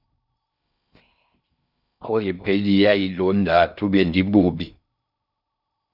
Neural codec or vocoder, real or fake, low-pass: codec, 16 kHz in and 24 kHz out, 0.6 kbps, FocalCodec, streaming, 4096 codes; fake; 5.4 kHz